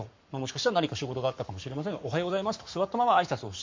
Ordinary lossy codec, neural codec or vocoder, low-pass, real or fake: MP3, 48 kbps; codec, 44.1 kHz, 7.8 kbps, Pupu-Codec; 7.2 kHz; fake